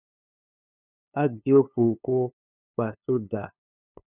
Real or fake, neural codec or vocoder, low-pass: fake; codec, 16 kHz, 2 kbps, FunCodec, trained on LibriTTS, 25 frames a second; 3.6 kHz